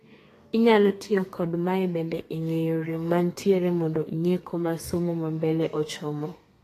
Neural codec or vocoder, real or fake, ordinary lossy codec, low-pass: codec, 32 kHz, 1.9 kbps, SNAC; fake; AAC, 48 kbps; 14.4 kHz